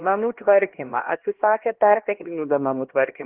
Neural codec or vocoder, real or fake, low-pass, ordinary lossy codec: codec, 16 kHz, 1 kbps, X-Codec, HuBERT features, trained on LibriSpeech; fake; 3.6 kHz; Opus, 16 kbps